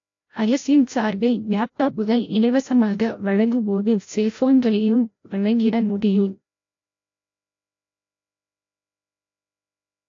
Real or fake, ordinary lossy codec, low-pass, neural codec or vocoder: fake; AAC, 48 kbps; 7.2 kHz; codec, 16 kHz, 0.5 kbps, FreqCodec, larger model